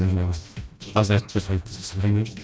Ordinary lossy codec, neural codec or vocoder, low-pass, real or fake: none; codec, 16 kHz, 1 kbps, FreqCodec, smaller model; none; fake